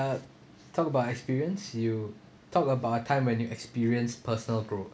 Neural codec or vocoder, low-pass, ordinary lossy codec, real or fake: none; none; none; real